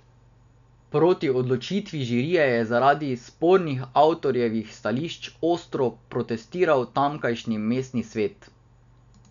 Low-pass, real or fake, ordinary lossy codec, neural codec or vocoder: 7.2 kHz; real; none; none